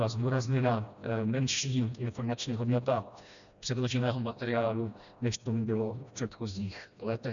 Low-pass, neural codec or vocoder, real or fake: 7.2 kHz; codec, 16 kHz, 1 kbps, FreqCodec, smaller model; fake